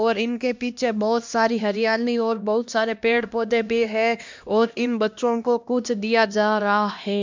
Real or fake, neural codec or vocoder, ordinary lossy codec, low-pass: fake; codec, 16 kHz, 1 kbps, X-Codec, HuBERT features, trained on LibriSpeech; MP3, 64 kbps; 7.2 kHz